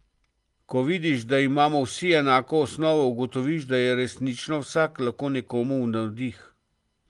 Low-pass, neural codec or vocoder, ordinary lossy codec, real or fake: 10.8 kHz; none; Opus, 24 kbps; real